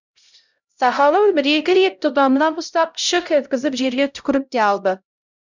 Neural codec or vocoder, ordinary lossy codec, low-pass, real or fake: codec, 16 kHz, 0.5 kbps, X-Codec, HuBERT features, trained on LibriSpeech; none; 7.2 kHz; fake